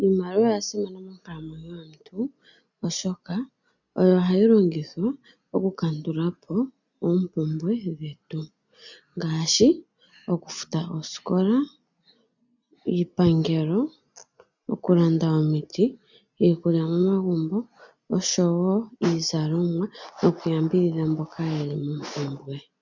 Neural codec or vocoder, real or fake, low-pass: none; real; 7.2 kHz